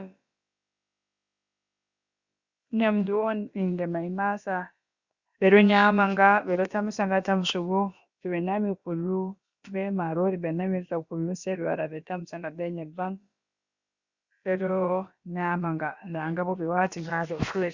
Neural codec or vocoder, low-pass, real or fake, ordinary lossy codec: codec, 16 kHz, about 1 kbps, DyCAST, with the encoder's durations; 7.2 kHz; fake; Opus, 64 kbps